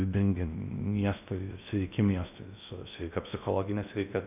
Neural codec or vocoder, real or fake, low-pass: codec, 16 kHz in and 24 kHz out, 0.6 kbps, FocalCodec, streaming, 4096 codes; fake; 3.6 kHz